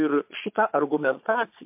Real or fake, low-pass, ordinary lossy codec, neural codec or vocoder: fake; 3.6 kHz; MP3, 32 kbps; vocoder, 22.05 kHz, 80 mel bands, Vocos